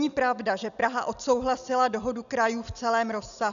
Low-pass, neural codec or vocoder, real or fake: 7.2 kHz; none; real